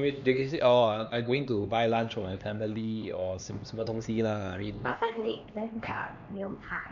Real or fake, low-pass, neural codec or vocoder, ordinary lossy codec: fake; 7.2 kHz; codec, 16 kHz, 2 kbps, X-Codec, HuBERT features, trained on LibriSpeech; none